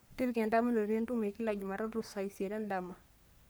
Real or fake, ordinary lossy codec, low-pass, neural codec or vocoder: fake; none; none; codec, 44.1 kHz, 3.4 kbps, Pupu-Codec